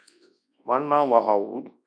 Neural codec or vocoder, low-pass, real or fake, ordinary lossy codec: codec, 24 kHz, 0.9 kbps, WavTokenizer, large speech release; 9.9 kHz; fake; MP3, 96 kbps